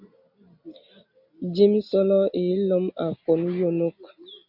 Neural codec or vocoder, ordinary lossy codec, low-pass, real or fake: none; AAC, 48 kbps; 5.4 kHz; real